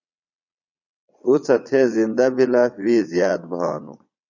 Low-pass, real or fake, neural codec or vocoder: 7.2 kHz; fake; vocoder, 22.05 kHz, 80 mel bands, Vocos